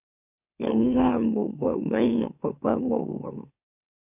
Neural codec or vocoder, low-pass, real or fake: autoencoder, 44.1 kHz, a latent of 192 numbers a frame, MeloTTS; 3.6 kHz; fake